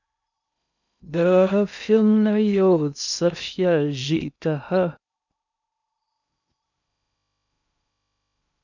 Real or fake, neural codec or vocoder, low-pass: fake; codec, 16 kHz in and 24 kHz out, 0.6 kbps, FocalCodec, streaming, 2048 codes; 7.2 kHz